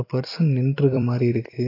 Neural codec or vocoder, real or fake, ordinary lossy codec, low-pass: vocoder, 44.1 kHz, 128 mel bands every 256 samples, BigVGAN v2; fake; AAC, 24 kbps; 5.4 kHz